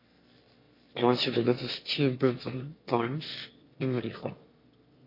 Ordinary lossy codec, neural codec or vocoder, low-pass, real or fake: MP3, 32 kbps; autoencoder, 22.05 kHz, a latent of 192 numbers a frame, VITS, trained on one speaker; 5.4 kHz; fake